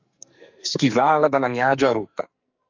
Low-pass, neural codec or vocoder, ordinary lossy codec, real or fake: 7.2 kHz; codec, 44.1 kHz, 2.6 kbps, SNAC; MP3, 48 kbps; fake